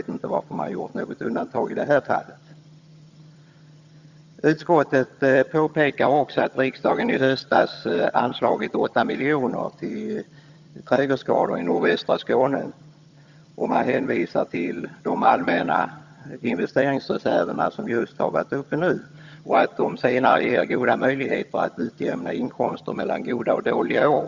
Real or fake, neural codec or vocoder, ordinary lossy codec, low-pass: fake; vocoder, 22.05 kHz, 80 mel bands, HiFi-GAN; Opus, 64 kbps; 7.2 kHz